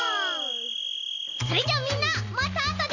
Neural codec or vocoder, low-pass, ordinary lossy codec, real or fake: none; 7.2 kHz; none; real